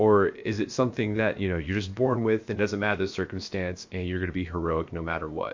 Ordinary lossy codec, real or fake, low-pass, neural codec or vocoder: MP3, 48 kbps; fake; 7.2 kHz; codec, 16 kHz, about 1 kbps, DyCAST, with the encoder's durations